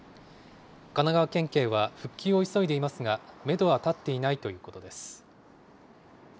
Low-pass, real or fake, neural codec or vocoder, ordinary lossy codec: none; real; none; none